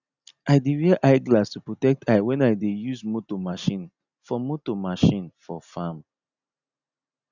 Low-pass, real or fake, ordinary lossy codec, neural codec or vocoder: 7.2 kHz; real; none; none